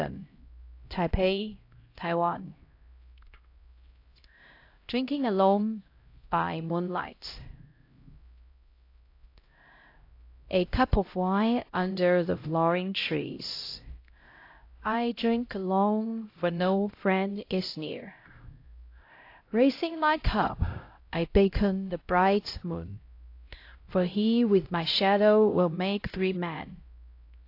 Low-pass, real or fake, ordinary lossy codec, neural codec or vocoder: 5.4 kHz; fake; AAC, 32 kbps; codec, 16 kHz, 0.5 kbps, X-Codec, HuBERT features, trained on LibriSpeech